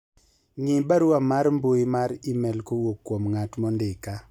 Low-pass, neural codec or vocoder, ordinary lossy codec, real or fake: 19.8 kHz; none; none; real